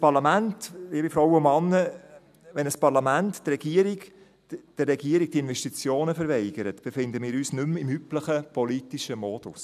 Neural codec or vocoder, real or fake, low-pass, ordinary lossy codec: none; real; 14.4 kHz; none